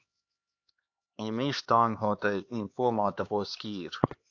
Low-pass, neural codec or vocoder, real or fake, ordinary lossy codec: 7.2 kHz; codec, 16 kHz, 2 kbps, X-Codec, HuBERT features, trained on LibriSpeech; fake; AAC, 48 kbps